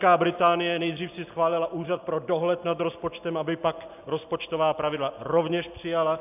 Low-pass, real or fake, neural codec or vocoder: 3.6 kHz; real; none